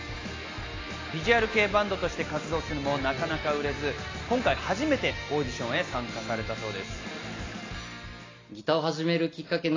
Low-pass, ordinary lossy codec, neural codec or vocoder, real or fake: 7.2 kHz; AAC, 32 kbps; none; real